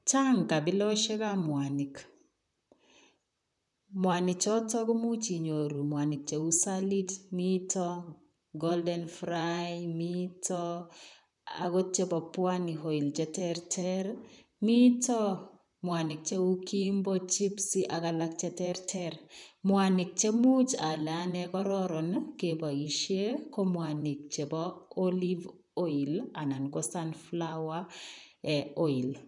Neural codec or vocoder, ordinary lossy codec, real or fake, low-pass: vocoder, 44.1 kHz, 128 mel bands, Pupu-Vocoder; none; fake; 10.8 kHz